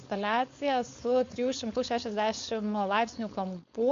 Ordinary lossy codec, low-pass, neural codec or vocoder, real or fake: MP3, 48 kbps; 7.2 kHz; codec, 16 kHz, 4.8 kbps, FACodec; fake